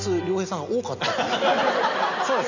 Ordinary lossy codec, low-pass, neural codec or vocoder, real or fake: none; 7.2 kHz; none; real